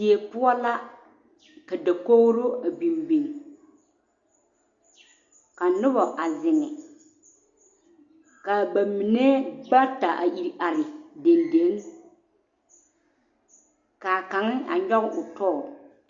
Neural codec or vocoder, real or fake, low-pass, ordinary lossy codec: none; real; 7.2 kHz; Opus, 64 kbps